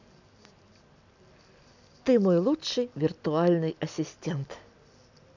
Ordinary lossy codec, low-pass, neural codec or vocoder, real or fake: none; 7.2 kHz; none; real